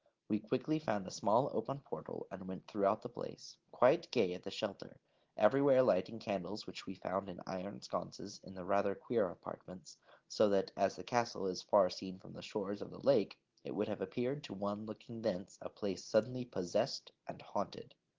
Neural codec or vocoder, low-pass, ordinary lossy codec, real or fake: none; 7.2 kHz; Opus, 16 kbps; real